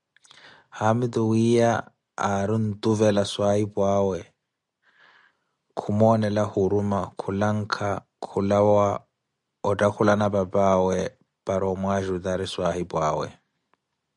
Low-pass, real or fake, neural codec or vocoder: 10.8 kHz; real; none